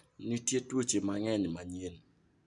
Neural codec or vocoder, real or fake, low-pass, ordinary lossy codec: none; real; 10.8 kHz; none